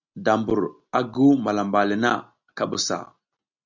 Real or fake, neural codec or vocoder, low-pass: real; none; 7.2 kHz